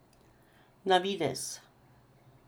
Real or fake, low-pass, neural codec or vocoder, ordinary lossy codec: real; none; none; none